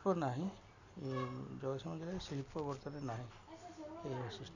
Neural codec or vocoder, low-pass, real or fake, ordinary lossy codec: none; 7.2 kHz; real; none